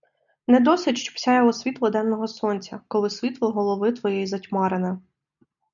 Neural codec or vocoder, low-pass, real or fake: none; 7.2 kHz; real